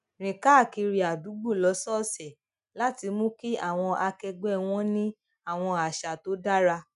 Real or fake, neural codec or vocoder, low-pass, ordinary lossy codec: real; none; 10.8 kHz; none